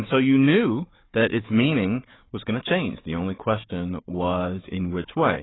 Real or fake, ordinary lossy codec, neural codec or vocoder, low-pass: fake; AAC, 16 kbps; codec, 16 kHz, 4 kbps, FunCodec, trained on Chinese and English, 50 frames a second; 7.2 kHz